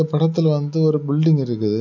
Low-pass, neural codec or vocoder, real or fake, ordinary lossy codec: 7.2 kHz; none; real; none